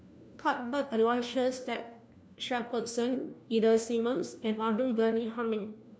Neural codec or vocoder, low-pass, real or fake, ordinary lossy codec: codec, 16 kHz, 1 kbps, FunCodec, trained on LibriTTS, 50 frames a second; none; fake; none